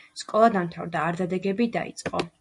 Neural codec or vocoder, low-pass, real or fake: none; 10.8 kHz; real